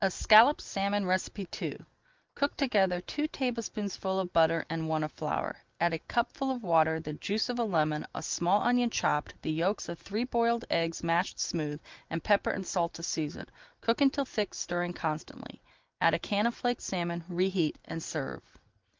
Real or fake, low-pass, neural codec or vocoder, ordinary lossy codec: real; 7.2 kHz; none; Opus, 24 kbps